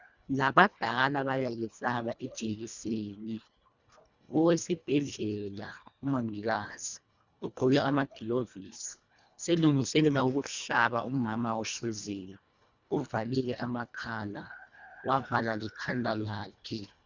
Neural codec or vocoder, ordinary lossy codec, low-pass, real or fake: codec, 24 kHz, 1.5 kbps, HILCodec; Opus, 64 kbps; 7.2 kHz; fake